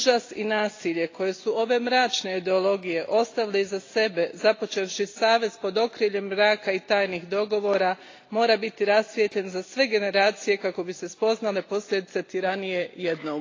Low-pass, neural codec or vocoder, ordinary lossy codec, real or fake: 7.2 kHz; none; AAC, 32 kbps; real